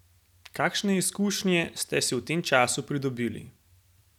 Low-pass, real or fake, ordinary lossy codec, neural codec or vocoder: 19.8 kHz; real; none; none